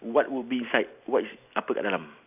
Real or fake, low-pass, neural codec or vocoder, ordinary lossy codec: real; 3.6 kHz; none; none